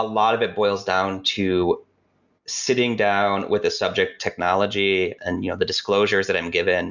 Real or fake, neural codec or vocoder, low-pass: real; none; 7.2 kHz